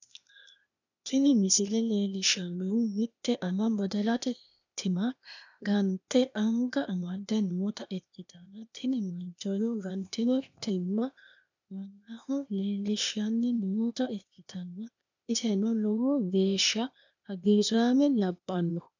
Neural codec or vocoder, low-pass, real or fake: codec, 16 kHz, 0.8 kbps, ZipCodec; 7.2 kHz; fake